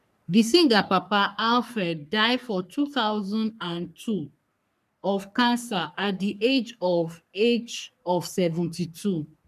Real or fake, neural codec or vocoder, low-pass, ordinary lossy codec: fake; codec, 44.1 kHz, 3.4 kbps, Pupu-Codec; 14.4 kHz; none